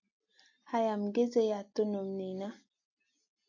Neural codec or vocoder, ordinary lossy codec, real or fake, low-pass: none; AAC, 48 kbps; real; 7.2 kHz